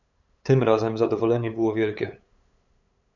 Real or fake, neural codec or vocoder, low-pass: fake; codec, 16 kHz, 8 kbps, FunCodec, trained on LibriTTS, 25 frames a second; 7.2 kHz